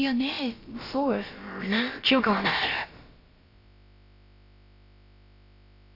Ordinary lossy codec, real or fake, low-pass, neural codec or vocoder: AAC, 32 kbps; fake; 5.4 kHz; codec, 16 kHz, about 1 kbps, DyCAST, with the encoder's durations